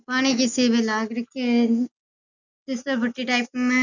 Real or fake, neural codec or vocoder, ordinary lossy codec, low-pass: real; none; none; 7.2 kHz